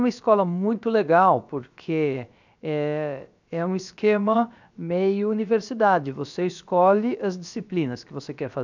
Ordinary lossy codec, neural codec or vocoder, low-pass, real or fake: none; codec, 16 kHz, about 1 kbps, DyCAST, with the encoder's durations; 7.2 kHz; fake